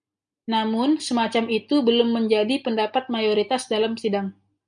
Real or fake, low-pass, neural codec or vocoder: real; 9.9 kHz; none